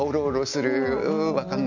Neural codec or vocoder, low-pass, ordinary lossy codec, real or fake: none; 7.2 kHz; none; real